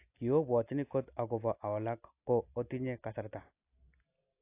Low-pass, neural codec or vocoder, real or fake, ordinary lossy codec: 3.6 kHz; none; real; AAC, 24 kbps